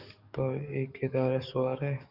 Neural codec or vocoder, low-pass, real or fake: codec, 44.1 kHz, 7.8 kbps, DAC; 5.4 kHz; fake